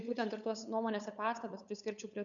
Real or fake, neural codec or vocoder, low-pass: fake; codec, 16 kHz, 8 kbps, FunCodec, trained on LibriTTS, 25 frames a second; 7.2 kHz